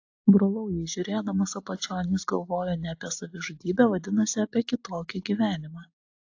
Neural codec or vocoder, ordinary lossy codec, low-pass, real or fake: none; AAC, 48 kbps; 7.2 kHz; real